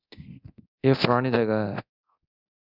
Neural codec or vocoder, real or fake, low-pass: codec, 24 kHz, 0.9 kbps, DualCodec; fake; 5.4 kHz